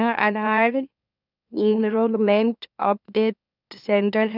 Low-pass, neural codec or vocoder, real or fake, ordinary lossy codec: 5.4 kHz; autoencoder, 44.1 kHz, a latent of 192 numbers a frame, MeloTTS; fake; none